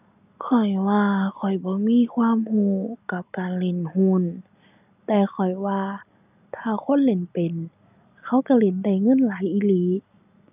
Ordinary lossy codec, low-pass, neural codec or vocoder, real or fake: none; 3.6 kHz; none; real